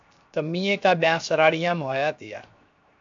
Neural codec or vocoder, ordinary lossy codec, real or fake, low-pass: codec, 16 kHz, 0.7 kbps, FocalCodec; AAC, 64 kbps; fake; 7.2 kHz